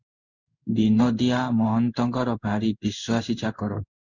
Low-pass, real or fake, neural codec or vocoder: 7.2 kHz; fake; codec, 16 kHz in and 24 kHz out, 1 kbps, XY-Tokenizer